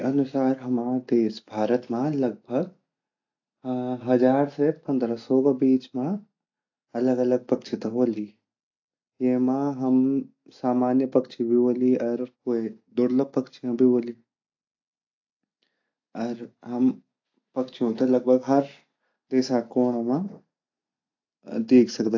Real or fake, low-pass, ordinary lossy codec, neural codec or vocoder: real; 7.2 kHz; AAC, 48 kbps; none